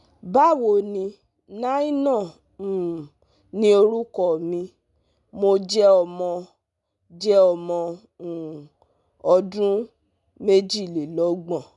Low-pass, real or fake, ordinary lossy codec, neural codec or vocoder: 10.8 kHz; real; none; none